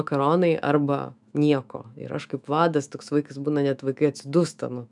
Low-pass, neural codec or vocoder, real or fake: 10.8 kHz; autoencoder, 48 kHz, 128 numbers a frame, DAC-VAE, trained on Japanese speech; fake